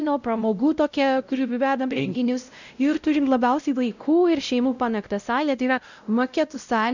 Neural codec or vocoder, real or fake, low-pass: codec, 16 kHz, 0.5 kbps, X-Codec, WavLM features, trained on Multilingual LibriSpeech; fake; 7.2 kHz